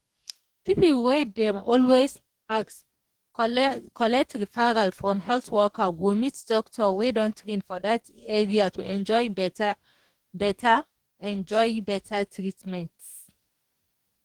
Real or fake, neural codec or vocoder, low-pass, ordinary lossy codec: fake; codec, 44.1 kHz, 2.6 kbps, DAC; 19.8 kHz; Opus, 24 kbps